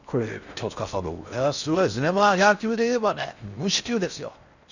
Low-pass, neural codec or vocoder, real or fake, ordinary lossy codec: 7.2 kHz; codec, 16 kHz in and 24 kHz out, 0.6 kbps, FocalCodec, streaming, 4096 codes; fake; none